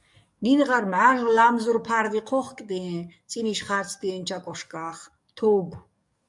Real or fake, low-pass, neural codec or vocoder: fake; 10.8 kHz; codec, 44.1 kHz, 7.8 kbps, DAC